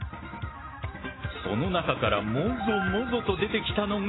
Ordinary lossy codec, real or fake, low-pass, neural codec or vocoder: AAC, 16 kbps; real; 7.2 kHz; none